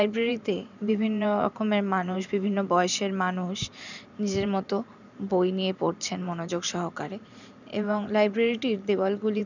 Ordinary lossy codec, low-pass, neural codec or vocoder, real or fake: none; 7.2 kHz; vocoder, 44.1 kHz, 128 mel bands every 512 samples, BigVGAN v2; fake